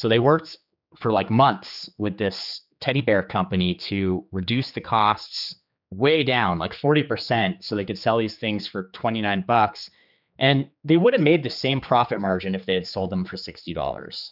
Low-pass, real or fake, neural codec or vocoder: 5.4 kHz; fake; codec, 16 kHz, 4 kbps, X-Codec, HuBERT features, trained on general audio